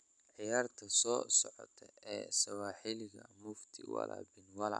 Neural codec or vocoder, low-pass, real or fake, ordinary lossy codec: none; 9.9 kHz; real; none